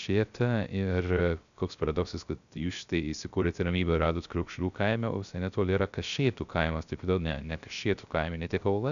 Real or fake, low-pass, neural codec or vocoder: fake; 7.2 kHz; codec, 16 kHz, 0.3 kbps, FocalCodec